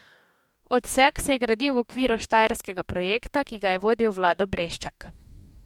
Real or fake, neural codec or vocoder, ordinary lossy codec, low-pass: fake; codec, 44.1 kHz, 2.6 kbps, DAC; MP3, 96 kbps; 19.8 kHz